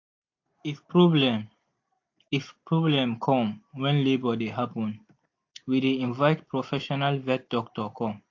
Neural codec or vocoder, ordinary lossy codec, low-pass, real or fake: none; AAC, 48 kbps; 7.2 kHz; real